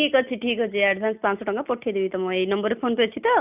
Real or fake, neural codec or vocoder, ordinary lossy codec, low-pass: real; none; none; 3.6 kHz